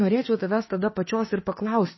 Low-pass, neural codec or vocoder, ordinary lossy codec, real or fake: 7.2 kHz; codec, 16 kHz, 6 kbps, DAC; MP3, 24 kbps; fake